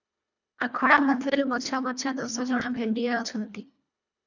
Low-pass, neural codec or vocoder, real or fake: 7.2 kHz; codec, 24 kHz, 1.5 kbps, HILCodec; fake